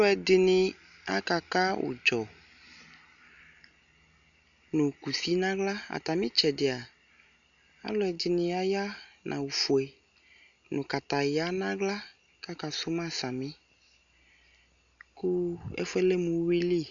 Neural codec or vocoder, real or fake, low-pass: none; real; 7.2 kHz